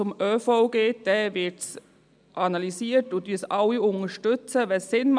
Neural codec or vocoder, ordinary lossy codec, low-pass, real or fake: none; none; 9.9 kHz; real